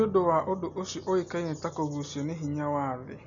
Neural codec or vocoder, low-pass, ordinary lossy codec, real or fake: none; 7.2 kHz; none; real